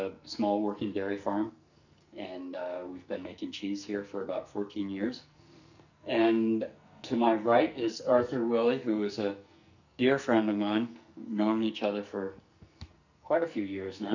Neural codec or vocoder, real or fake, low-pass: codec, 44.1 kHz, 2.6 kbps, SNAC; fake; 7.2 kHz